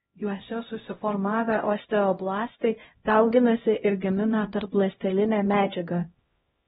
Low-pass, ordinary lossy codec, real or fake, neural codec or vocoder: 7.2 kHz; AAC, 16 kbps; fake; codec, 16 kHz, 0.5 kbps, X-Codec, HuBERT features, trained on LibriSpeech